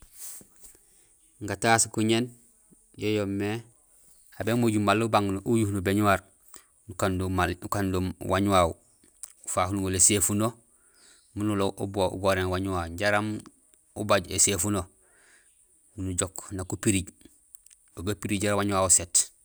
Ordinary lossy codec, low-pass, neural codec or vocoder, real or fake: none; none; none; real